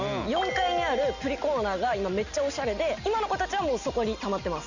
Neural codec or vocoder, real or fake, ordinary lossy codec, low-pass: none; real; none; 7.2 kHz